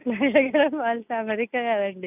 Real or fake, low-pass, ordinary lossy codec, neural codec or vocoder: real; 3.6 kHz; none; none